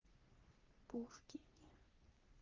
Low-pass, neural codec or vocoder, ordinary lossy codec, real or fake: 7.2 kHz; codec, 44.1 kHz, 3.4 kbps, Pupu-Codec; Opus, 32 kbps; fake